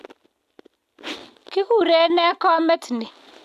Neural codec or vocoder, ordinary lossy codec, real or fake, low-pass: none; AAC, 96 kbps; real; 14.4 kHz